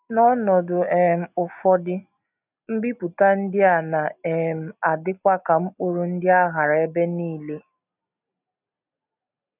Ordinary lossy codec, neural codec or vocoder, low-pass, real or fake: none; none; 3.6 kHz; real